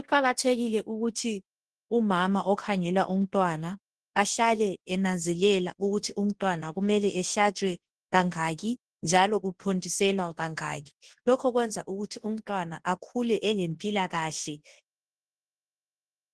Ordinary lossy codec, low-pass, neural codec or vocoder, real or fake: Opus, 16 kbps; 10.8 kHz; codec, 24 kHz, 0.9 kbps, WavTokenizer, large speech release; fake